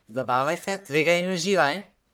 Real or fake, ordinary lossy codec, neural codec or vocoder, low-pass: fake; none; codec, 44.1 kHz, 1.7 kbps, Pupu-Codec; none